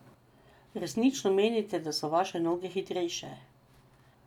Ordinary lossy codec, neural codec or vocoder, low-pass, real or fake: none; none; 19.8 kHz; real